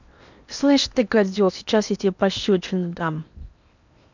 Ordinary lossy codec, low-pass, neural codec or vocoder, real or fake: none; 7.2 kHz; codec, 16 kHz in and 24 kHz out, 0.8 kbps, FocalCodec, streaming, 65536 codes; fake